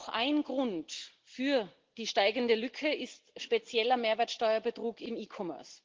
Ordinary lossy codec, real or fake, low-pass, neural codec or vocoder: Opus, 16 kbps; real; 7.2 kHz; none